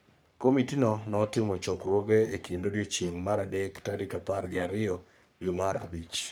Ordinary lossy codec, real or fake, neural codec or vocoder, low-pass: none; fake; codec, 44.1 kHz, 3.4 kbps, Pupu-Codec; none